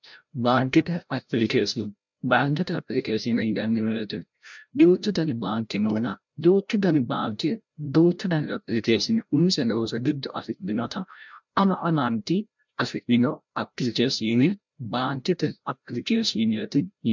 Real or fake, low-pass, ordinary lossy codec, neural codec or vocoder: fake; 7.2 kHz; MP3, 64 kbps; codec, 16 kHz, 0.5 kbps, FreqCodec, larger model